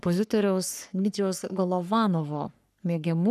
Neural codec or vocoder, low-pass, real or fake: codec, 44.1 kHz, 3.4 kbps, Pupu-Codec; 14.4 kHz; fake